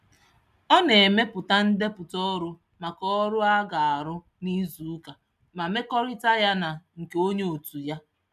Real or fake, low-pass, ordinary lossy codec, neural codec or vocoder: real; 14.4 kHz; none; none